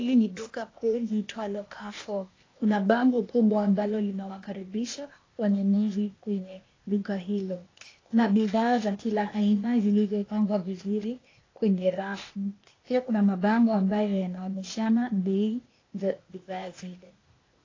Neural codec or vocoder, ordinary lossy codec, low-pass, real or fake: codec, 16 kHz, 0.8 kbps, ZipCodec; AAC, 32 kbps; 7.2 kHz; fake